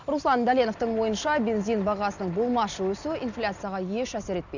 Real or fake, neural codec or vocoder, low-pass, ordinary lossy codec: real; none; 7.2 kHz; none